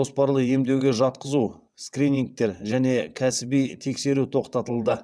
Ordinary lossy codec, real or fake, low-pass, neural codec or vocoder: none; fake; none; vocoder, 22.05 kHz, 80 mel bands, WaveNeXt